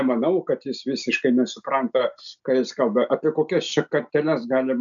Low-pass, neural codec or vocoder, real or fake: 7.2 kHz; none; real